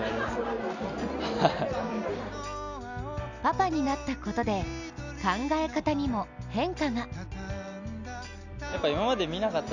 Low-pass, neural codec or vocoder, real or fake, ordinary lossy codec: 7.2 kHz; none; real; none